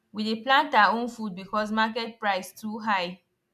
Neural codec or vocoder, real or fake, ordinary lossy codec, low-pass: none; real; MP3, 96 kbps; 14.4 kHz